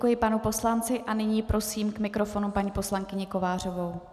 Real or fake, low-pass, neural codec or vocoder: real; 14.4 kHz; none